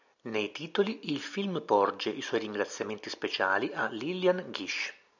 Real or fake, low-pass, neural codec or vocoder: real; 7.2 kHz; none